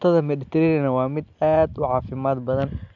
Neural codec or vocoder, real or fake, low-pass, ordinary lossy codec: none; real; 7.2 kHz; none